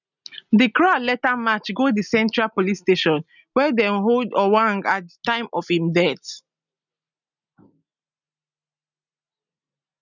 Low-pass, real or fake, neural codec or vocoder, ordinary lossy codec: 7.2 kHz; real; none; none